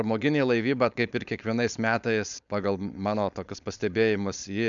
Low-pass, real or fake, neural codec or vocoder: 7.2 kHz; fake; codec, 16 kHz, 4.8 kbps, FACodec